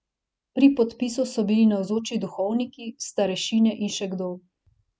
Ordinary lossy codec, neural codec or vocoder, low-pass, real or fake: none; none; none; real